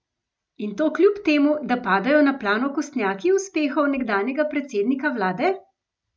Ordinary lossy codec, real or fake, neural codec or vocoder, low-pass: none; real; none; none